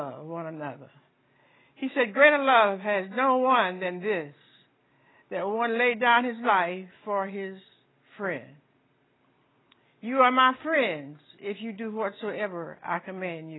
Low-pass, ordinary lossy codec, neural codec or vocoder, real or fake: 7.2 kHz; AAC, 16 kbps; none; real